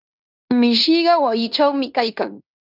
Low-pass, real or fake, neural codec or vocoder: 5.4 kHz; fake; codec, 16 kHz in and 24 kHz out, 0.9 kbps, LongCat-Audio-Codec, fine tuned four codebook decoder